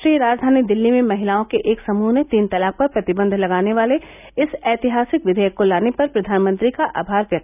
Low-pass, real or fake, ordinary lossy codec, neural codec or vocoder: 3.6 kHz; real; none; none